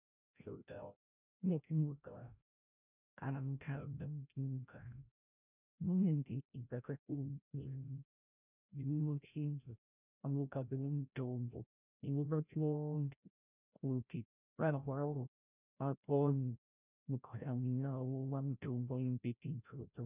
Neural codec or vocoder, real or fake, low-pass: codec, 16 kHz, 0.5 kbps, FreqCodec, larger model; fake; 3.6 kHz